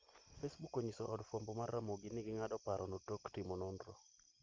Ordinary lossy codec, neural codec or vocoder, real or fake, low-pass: Opus, 24 kbps; none; real; 7.2 kHz